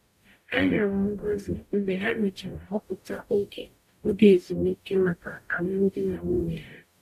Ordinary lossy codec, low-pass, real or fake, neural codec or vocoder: none; 14.4 kHz; fake; codec, 44.1 kHz, 0.9 kbps, DAC